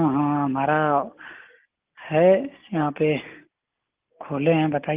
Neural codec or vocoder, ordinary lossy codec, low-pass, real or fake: none; Opus, 32 kbps; 3.6 kHz; real